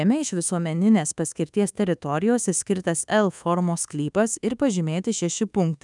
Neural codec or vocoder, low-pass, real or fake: codec, 24 kHz, 1.2 kbps, DualCodec; 10.8 kHz; fake